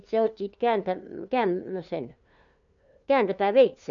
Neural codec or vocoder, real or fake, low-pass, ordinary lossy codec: codec, 16 kHz, 2 kbps, FunCodec, trained on LibriTTS, 25 frames a second; fake; 7.2 kHz; none